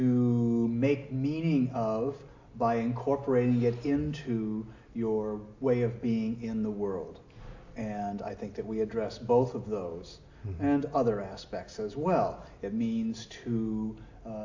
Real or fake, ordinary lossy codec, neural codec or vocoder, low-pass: real; AAC, 48 kbps; none; 7.2 kHz